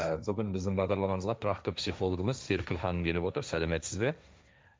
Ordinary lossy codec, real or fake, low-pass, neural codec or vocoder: none; fake; none; codec, 16 kHz, 1.1 kbps, Voila-Tokenizer